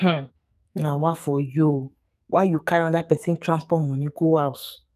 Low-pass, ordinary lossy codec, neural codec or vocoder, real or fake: 14.4 kHz; none; codec, 44.1 kHz, 2.6 kbps, SNAC; fake